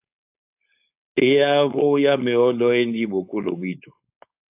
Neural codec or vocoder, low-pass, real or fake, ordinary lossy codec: codec, 16 kHz, 4.8 kbps, FACodec; 3.6 kHz; fake; AAC, 32 kbps